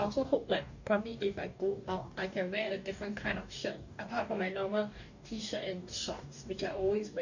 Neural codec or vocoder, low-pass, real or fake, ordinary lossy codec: codec, 44.1 kHz, 2.6 kbps, DAC; 7.2 kHz; fake; none